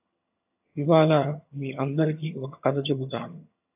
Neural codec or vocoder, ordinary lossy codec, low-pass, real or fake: vocoder, 22.05 kHz, 80 mel bands, HiFi-GAN; AAC, 32 kbps; 3.6 kHz; fake